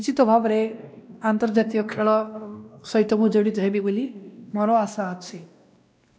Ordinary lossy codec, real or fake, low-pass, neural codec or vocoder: none; fake; none; codec, 16 kHz, 1 kbps, X-Codec, WavLM features, trained on Multilingual LibriSpeech